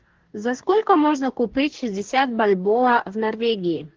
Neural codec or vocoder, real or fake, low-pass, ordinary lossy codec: codec, 44.1 kHz, 2.6 kbps, DAC; fake; 7.2 kHz; Opus, 16 kbps